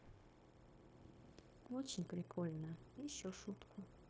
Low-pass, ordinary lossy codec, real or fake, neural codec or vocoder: none; none; fake; codec, 16 kHz, 0.9 kbps, LongCat-Audio-Codec